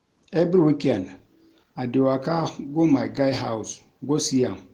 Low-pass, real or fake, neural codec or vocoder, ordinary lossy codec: 19.8 kHz; real; none; Opus, 16 kbps